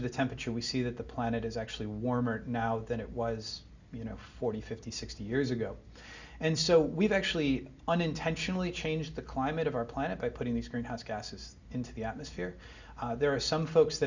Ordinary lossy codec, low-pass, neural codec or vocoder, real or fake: Opus, 64 kbps; 7.2 kHz; none; real